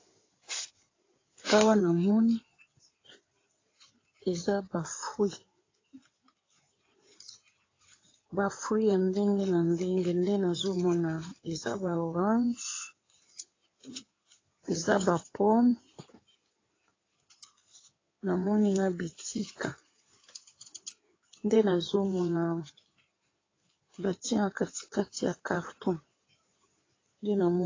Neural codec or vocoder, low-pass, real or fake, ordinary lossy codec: codec, 16 kHz in and 24 kHz out, 2.2 kbps, FireRedTTS-2 codec; 7.2 kHz; fake; AAC, 32 kbps